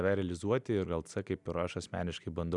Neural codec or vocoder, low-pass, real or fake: none; 10.8 kHz; real